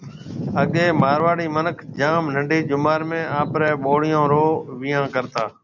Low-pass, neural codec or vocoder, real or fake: 7.2 kHz; none; real